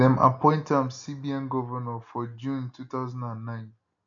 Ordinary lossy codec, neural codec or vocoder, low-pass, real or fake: none; none; 7.2 kHz; real